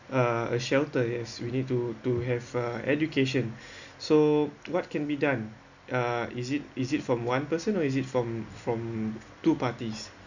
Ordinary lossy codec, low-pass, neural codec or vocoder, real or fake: none; 7.2 kHz; none; real